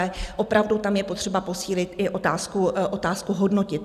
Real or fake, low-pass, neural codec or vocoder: fake; 14.4 kHz; vocoder, 44.1 kHz, 128 mel bands every 256 samples, BigVGAN v2